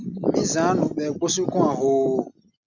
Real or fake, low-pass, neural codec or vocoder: real; 7.2 kHz; none